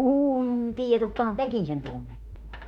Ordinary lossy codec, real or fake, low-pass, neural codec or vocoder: none; fake; 19.8 kHz; autoencoder, 48 kHz, 32 numbers a frame, DAC-VAE, trained on Japanese speech